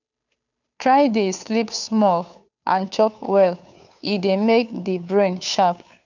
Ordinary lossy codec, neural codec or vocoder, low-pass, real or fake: none; codec, 16 kHz, 2 kbps, FunCodec, trained on Chinese and English, 25 frames a second; 7.2 kHz; fake